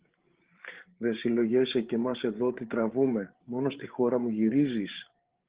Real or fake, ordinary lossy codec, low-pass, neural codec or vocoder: fake; Opus, 16 kbps; 3.6 kHz; codec, 16 kHz, 8 kbps, FreqCodec, larger model